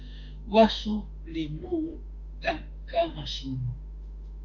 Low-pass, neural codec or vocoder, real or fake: 7.2 kHz; autoencoder, 48 kHz, 32 numbers a frame, DAC-VAE, trained on Japanese speech; fake